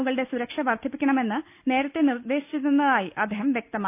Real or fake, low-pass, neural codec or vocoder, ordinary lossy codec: real; 3.6 kHz; none; none